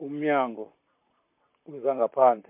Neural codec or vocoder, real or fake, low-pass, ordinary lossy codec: vocoder, 44.1 kHz, 128 mel bands, Pupu-Vocoder; fake; 3.6 kHz; MP3, 32 kbps